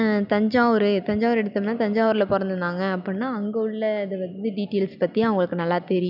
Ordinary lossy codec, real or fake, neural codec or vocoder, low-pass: none; real; none; 5.4 kHz